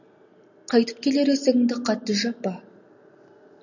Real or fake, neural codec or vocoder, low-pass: real; none; 7.2 kHz